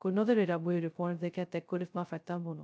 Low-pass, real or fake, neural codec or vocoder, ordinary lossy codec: none; fake; codec, 16 kHz, 0.2 kbps, FocalCodec; none